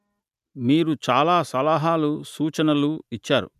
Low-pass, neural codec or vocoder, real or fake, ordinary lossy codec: 14.4 kHz; none; real; none